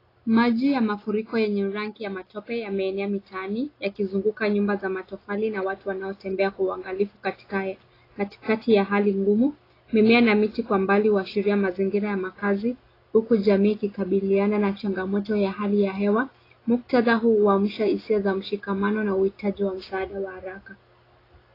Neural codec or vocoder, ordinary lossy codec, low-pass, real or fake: none; AAC, 24 kbps; 5.4 kHz; real